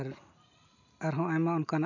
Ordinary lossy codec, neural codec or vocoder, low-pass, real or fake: none; none; 7.2 kHz; real